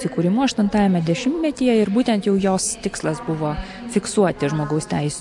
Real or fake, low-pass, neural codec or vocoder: real; 10.8 kHz; none